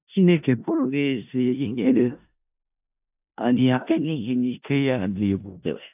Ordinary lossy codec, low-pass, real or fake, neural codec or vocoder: AAC, 32 kbps; 3.6 kHz; fake; codec, 16 kHz in and 24 kHz out, 0.4 kbps, LongCat-Audio-Codec, four codebook decoder